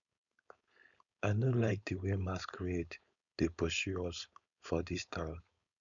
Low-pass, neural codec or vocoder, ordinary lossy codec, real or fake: 7.2 kHz; codec, 16 kHz, 4.8 kbps, FACodec; none; fake